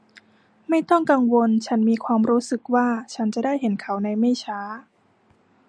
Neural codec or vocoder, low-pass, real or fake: none; 9.9 kHz; real